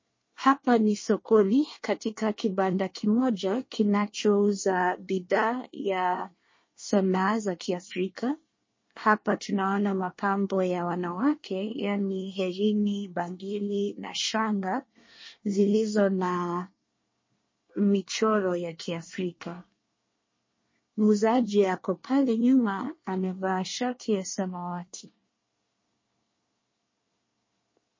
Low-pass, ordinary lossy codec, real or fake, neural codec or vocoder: 7.2 kHz; MP3, 32 kbps; fake; codec, 24 kHz, 1 kbps, SNAC